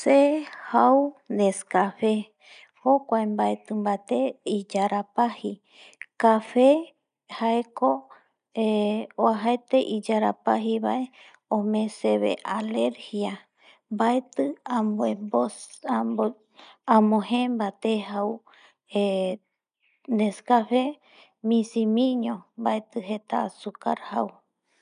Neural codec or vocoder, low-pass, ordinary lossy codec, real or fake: none; 9.9 kHz; none; real